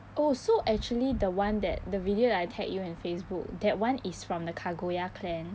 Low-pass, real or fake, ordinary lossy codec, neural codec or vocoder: none; real; none; none